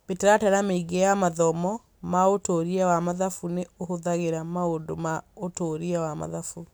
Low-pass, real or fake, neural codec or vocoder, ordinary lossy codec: none; real; none; none